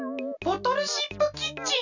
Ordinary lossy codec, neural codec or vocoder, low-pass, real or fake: none; none; 7.2 kHz; real